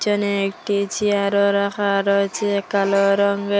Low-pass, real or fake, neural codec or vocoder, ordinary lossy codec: none; real; none; none